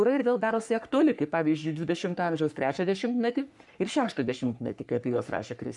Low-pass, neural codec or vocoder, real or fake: 10.8 kHz; codec, 44.1 kHz, 3.4 kbps, Pupu-Codec; fake